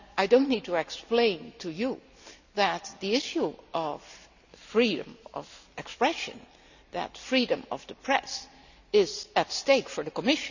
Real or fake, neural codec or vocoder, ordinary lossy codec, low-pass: real; none; none; 7.2 kHz